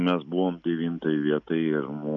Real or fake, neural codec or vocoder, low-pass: real; none; 7.2 kHz